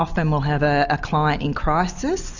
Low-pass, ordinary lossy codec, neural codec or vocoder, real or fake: 7.2 kHz; Opus, 64 kbps; none; real